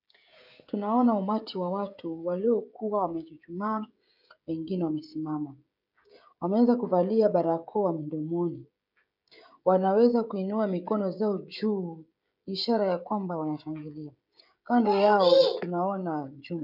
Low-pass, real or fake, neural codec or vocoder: 5.4 kHz; fake; codec, 16 kHz, 16 kbps, FreqCodec, smaller model